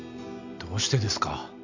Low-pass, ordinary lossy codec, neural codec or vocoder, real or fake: 7.2 kHz; none; none; real